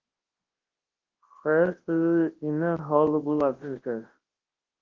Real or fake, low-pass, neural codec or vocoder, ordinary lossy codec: fake; 7.2 kHz; codec, 24 kHz, 0.9 kbps, WavTokenizer, large speech release; Opus, 16 kbps